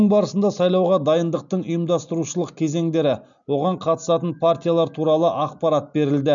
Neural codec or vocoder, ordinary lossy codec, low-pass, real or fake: none; none; 7.2 kHz; real